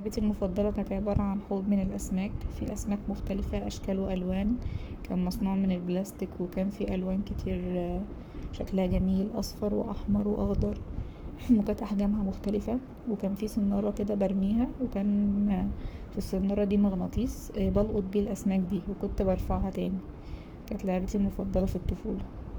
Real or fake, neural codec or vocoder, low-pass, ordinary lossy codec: fake; codec, 44.1 kHz, 7.8 kbps, Pupu-Codec; none; none